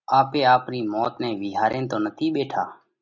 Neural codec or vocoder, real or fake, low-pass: none; real; 7.2 kHz